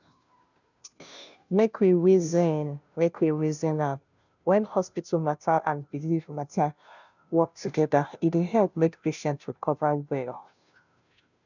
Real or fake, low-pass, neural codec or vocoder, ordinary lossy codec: fake; 7.2 kHz; codec, 16 kHz, 0.5 kbps, FunCodec, trained on Chinese and English, 25 frames a second; none